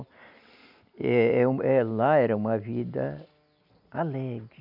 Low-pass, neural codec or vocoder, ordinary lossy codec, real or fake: 5.4 kHz; none; none; real